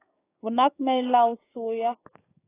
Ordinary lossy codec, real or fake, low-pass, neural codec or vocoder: AAC, 16 kbps; fake; 3.6 kHz; codec, 16 kHz, 6 kbps, DAC